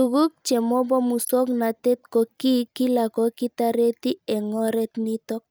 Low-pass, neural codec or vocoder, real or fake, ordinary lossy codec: none; none; real; none